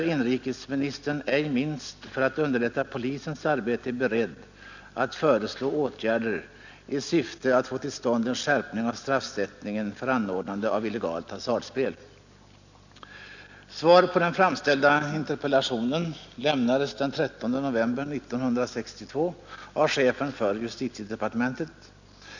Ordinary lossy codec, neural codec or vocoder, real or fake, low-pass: none; none; real; 7.2 kHz